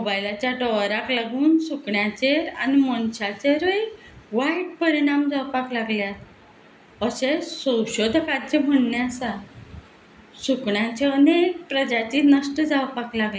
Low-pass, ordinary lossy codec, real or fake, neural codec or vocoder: none; none; real; none